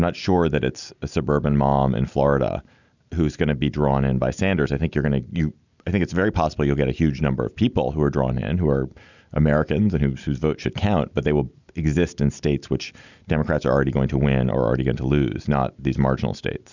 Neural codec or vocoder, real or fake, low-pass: none; real; 7.2 kHz